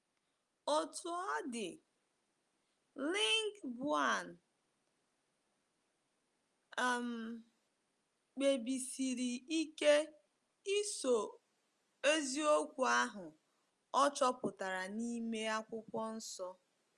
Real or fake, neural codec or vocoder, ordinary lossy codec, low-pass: real; none; Opus, 32 kbps; 10.8 kHz